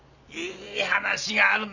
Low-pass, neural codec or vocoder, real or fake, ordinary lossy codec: 7.2 kHz; none; real; none